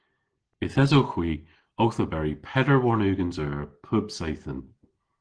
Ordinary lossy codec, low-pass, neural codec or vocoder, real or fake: Opus, 16 kbps; 9.9 kHz; none; real